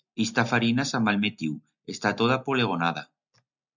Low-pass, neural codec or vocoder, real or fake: 7.2 kHz; none; real